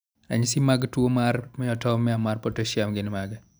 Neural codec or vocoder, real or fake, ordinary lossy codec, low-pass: none; real; none; none